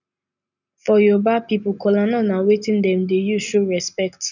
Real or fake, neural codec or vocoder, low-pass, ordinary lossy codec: real; none; 7.2 kHz; none